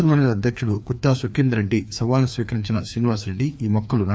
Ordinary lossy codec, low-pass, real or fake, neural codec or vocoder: none; none; fake; codec, 16 kHz, 2 kbps, FreqCodec, larger model